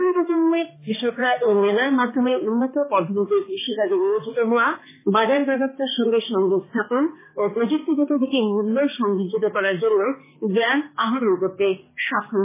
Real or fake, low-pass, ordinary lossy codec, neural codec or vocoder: fake; 3.6 kHz; MP3, 16 kbps; codec, 16 kHz, 2 kbps, X-Codec, HuBERT features, trained on balanced general audio